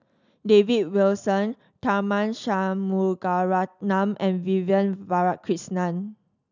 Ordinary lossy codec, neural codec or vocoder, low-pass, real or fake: none; none; 7.2 kHz; real